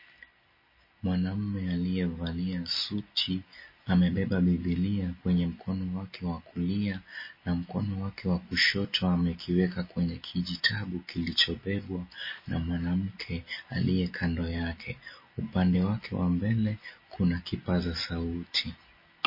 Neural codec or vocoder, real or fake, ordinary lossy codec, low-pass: none; real; MP3, 24 kbps; 5.4 kHz